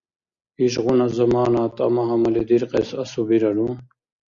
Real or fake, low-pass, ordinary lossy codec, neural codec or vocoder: real; 7.2 kHz; Opus, 64 kbps; none